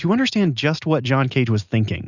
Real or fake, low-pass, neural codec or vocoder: real; 7.2 kHz; none